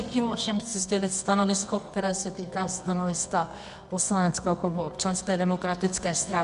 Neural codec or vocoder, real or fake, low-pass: codec, 24 kHz, 0.9 kbps, WavTokenizer, medium music audio release; fake; 10.8 kHz